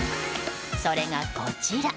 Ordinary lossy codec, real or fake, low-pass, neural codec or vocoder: none; real; none; none